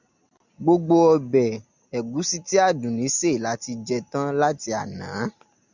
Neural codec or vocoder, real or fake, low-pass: none; real; 7.2 kHz